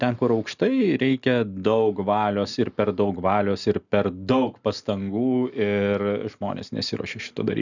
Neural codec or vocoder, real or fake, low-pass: none; real; 7.2 kHz